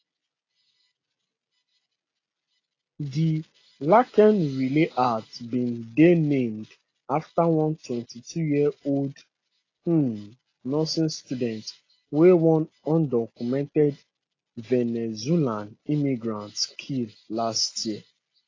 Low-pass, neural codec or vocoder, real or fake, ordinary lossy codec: 7.2 kHz; none; real; AAC, 32 kbps